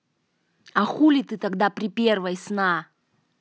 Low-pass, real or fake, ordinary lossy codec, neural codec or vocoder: none; real; none; none